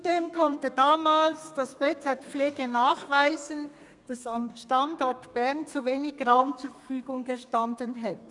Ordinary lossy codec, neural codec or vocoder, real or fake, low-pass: none; codec, 32 kHz, 1.9 kbps, SNAC; fake; 10.8 kHz